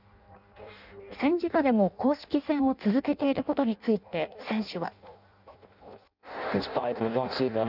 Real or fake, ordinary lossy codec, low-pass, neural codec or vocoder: fake; none; 5.4 kHz; codec, 16 kHz in and 24 kHz out, 0.6 kbps, FireRedTTS-2 codec